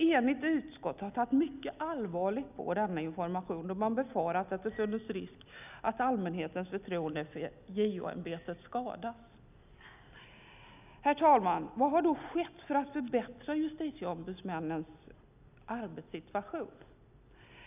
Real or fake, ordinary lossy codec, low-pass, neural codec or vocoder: real; none; 3.6 kHz; none